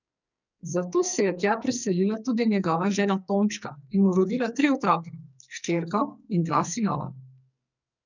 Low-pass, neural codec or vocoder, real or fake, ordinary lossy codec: 7.2 kHz; codec, 44.1 kHz, 2.6 kbps, SNAC; fake; none